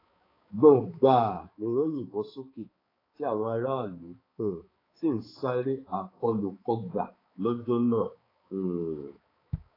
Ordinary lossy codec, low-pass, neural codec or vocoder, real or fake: AAC, 24 kbps; 5.4 kHz; codec, 16 kHz, 4 kbps, X-Codec, HuBERT features, trained on balanced general audio; fake